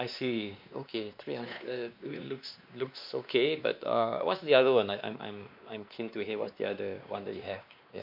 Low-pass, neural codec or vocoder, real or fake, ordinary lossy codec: 5.4 kHz; codec, 16 kHz, 2 kbps, X-Codec, WavLM features, trained on Multilingual LibriSpeech; fake; MP3, 48 kbps